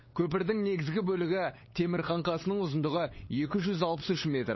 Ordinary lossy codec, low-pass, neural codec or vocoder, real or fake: MP3, 24 kbps; 7.2 kHz; codec, 16 kHz, 8 kbps, FunCodec, trained on LibriTTS, 25 frames a second; fake